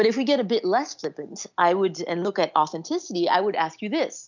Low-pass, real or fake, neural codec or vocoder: 7.2 kHz; real; none